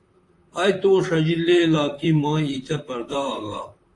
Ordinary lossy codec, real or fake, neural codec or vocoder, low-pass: AAC, 48 kbps; fake; vocoder, 44.1 kHz, 128 mel bands, Pupu-Vocoder; 10.8 kHz